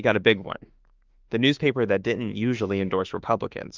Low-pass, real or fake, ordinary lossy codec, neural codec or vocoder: 7.2 kHz; fake; Opus, 24 kbps; autoencoder, 48 kHz, 32 numbers a frame, DAC-VAE, trained on Japanese speech